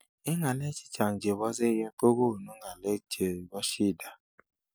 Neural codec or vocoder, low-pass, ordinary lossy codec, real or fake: none; none; none; real